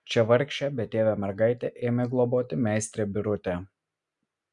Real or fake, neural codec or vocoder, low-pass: real; none; 10.8 kHz